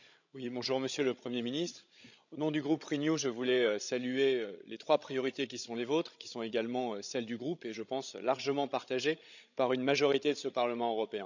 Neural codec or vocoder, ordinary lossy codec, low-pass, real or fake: codec, 16 kHz, 16 kbps, FreqCodec, larger model; none; 7.2 kHz; fake